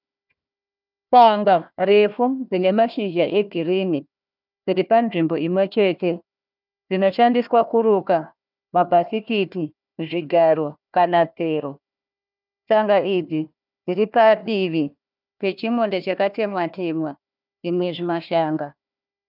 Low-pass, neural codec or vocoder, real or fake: 5.4 kHz; codec, 16 kHz, 1 kbps, FunCodec, trained on Chinese and English, 50 frames a second; fake